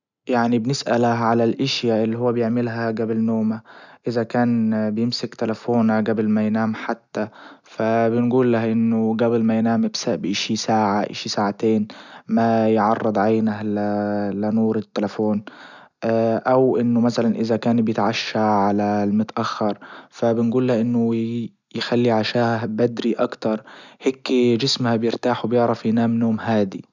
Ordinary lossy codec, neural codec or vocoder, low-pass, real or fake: none; none; 7.2 kHz; real